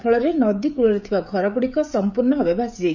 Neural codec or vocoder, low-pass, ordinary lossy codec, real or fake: codec, 16 kHz, 16 kbps, FreqCodec, smaller model; 7.2 kHz; none; fake